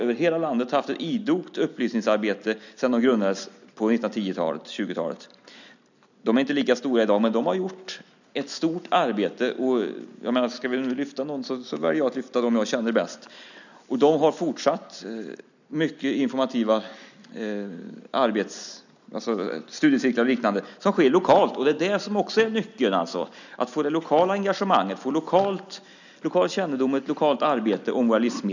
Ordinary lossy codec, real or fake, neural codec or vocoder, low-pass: none; real; none; 7.2 kHz